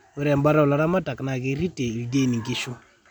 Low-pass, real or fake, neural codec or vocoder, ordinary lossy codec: 19.8 kHz; real; none; none